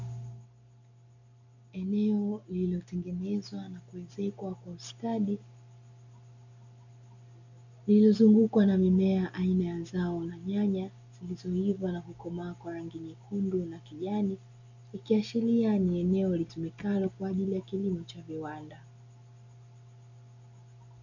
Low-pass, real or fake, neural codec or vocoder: 7.2 kHz; real; none